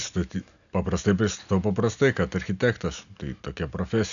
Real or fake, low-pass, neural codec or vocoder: real; 7.2 kHz; none